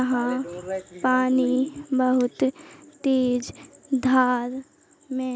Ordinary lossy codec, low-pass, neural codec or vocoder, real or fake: none; none; none; real